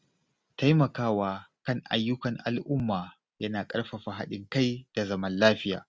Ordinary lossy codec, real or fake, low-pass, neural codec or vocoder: none; real; none; none